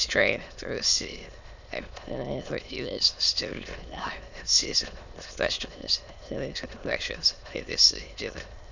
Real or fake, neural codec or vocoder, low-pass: fake; autoencoder, 22.05 kHz, a latent of 192 numbers a frame, VITS, trained on many speakers; 7.2 kHz